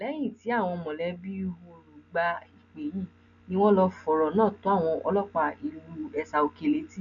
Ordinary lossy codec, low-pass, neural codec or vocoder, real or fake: none; 7.2 kHz; none; real